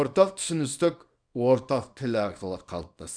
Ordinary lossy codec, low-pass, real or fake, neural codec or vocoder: none; 9.9 kHz; fake; codec, 24 kHz, 0.9 kbps, WavTokenizer, small release